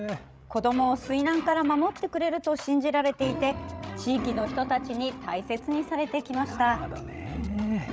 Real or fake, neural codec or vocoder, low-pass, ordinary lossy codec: fake; codec, 16 kHz, 16 kbps, FreqCodec, larger model; none; none